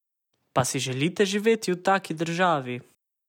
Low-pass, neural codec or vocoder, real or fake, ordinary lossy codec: 19.8 kHz; none; real; none